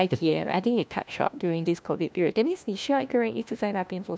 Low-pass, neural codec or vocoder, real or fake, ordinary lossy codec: none; codec, 16 kHz, 1 kbps, FunCodec, trained on LibriTTS, 50 frames a second; fake; none